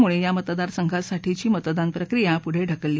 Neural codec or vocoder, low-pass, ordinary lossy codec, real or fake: none; none; none; real